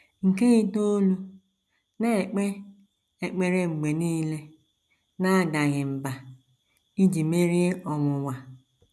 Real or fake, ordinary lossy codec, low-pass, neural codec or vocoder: real; none; none; none